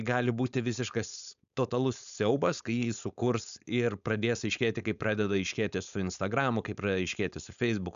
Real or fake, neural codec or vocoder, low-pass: fake; codec, 16 kHz, 4.8 kbps, FACodec; 7.2 kHz